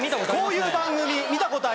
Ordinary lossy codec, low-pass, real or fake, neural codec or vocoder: none; none; real; none